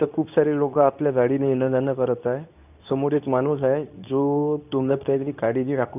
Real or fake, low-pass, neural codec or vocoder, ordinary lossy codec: fake; 3.6 kHz; codec, 24 kHz, 0.9 kbps, WavTokenizer, medium speech release version 2; none